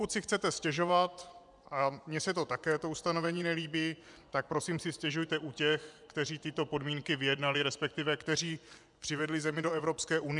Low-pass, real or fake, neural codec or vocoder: 10.8 kHz; fake; vocoder, 24 kHz, 100 mel bands, Vocos